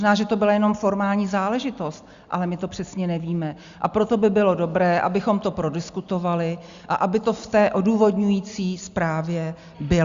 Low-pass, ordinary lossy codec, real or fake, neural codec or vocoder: 7.2 kHz; Opus, 64 kbps; real; none